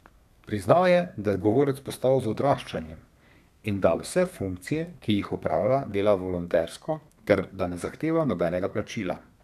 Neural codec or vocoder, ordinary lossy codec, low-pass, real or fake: codec, 32 kHz, 1.9 kbps, SNAC; none; 14.4 kHz; fake